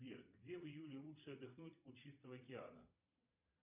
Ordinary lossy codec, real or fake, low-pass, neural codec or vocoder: MP3, 32 kbps; fake; 3.6 kHz; vocoder, 22.05 kHz, 80 mel bands, Vocos